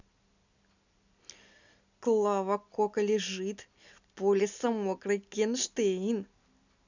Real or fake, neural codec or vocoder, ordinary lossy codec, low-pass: real; none; none; 7.2 kHz